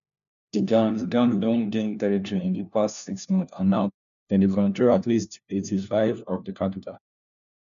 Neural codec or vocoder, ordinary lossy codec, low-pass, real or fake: codec, 16 kHz, 1 kbps, FunCodec, trained on LibriTTS, 50 frames a second; none; 7.2 kHz; fake